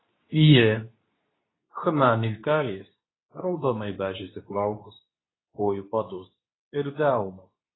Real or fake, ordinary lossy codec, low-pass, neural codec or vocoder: fake; AAC, 16 kbps; 7.2 kHz; codec, 24 kHz, 0.9 kbps, WavTokenizer, medium speech release version 2